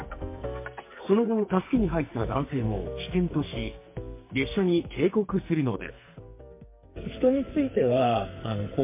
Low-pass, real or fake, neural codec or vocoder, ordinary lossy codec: 3.6 kHz; fake; codec, 44.1 kHz, 2.6 kbps, SNAC; MP3, 24 kbps